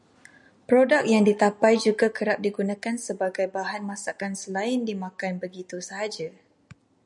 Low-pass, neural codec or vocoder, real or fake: 10.8 kHz; none; real